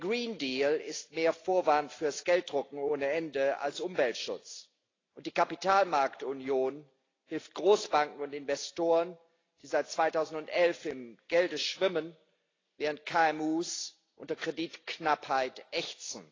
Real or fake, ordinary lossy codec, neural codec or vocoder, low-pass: real; AAC, 32 kbps; none; 7.2 kHz